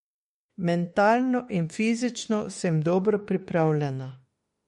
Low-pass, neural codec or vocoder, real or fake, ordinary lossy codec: 19.8 kHz; autoencoder, 48 kHz, 32 numbers a frame, DAC-VAE, trained on Japanese speech; fake; MP3, 48 kbps